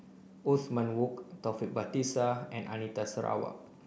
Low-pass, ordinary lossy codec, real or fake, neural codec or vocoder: none; none; real; none